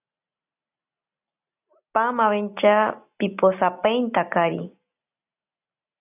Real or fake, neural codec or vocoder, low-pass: real; none; 3.6 kHz